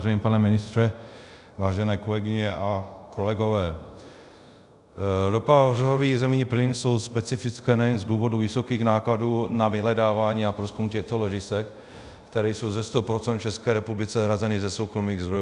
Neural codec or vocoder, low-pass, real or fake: codec, 24 kHz, 0.5 kbps, DualCodec; 10.8 kHz; fake